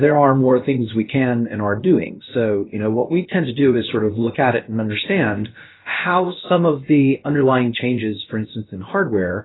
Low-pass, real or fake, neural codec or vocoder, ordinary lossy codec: 7.2 kHz; fake; codec, 16 kHz, about 1 kbps, DyCAST, with the encoder's durations; AAC, 16 kbps